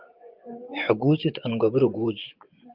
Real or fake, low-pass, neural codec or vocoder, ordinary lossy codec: fake; 5.4 kHz; vocoder, 24 kHz, 100 mel bands, Vocos; Opus, 24 kbps